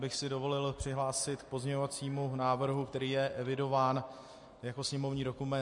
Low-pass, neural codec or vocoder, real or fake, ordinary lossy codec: 10.8 kHz; none; real; MP3, 48 kbps